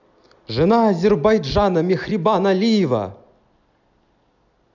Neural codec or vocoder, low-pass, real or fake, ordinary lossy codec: none; 7.2 kHz; real; none